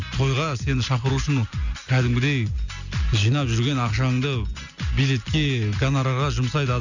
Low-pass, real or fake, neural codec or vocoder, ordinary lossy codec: 7.2 kHz; real; none; none